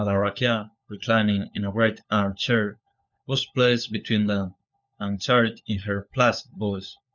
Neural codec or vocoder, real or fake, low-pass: codec, 16 kHz, 4 kbps, FunCodec, trained on LibriTTS, 50 frames a second; fake; 7.2 kHz